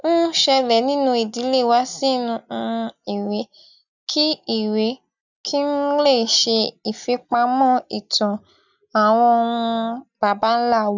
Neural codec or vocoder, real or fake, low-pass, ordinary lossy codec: none; real; 7.2 kHz; none